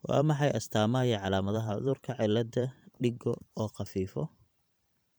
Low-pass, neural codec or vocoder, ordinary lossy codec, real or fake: none; vocoder, 44.1 kHz, 128 mel bands every 512 samples, BigVGAN v2; none; fake